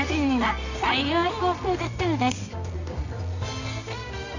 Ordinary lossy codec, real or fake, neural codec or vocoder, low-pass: none; fake; codec, 24 kHz, 0.9 kbps, WavTokenizer, medium music audio release; 7.2 kHz